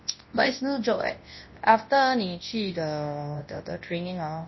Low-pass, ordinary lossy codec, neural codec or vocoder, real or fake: 7.2 kHz; MP3, 24 kbps; codec, 24 kHz, 0.9 kbps, WavTokenizer, large speech release; fake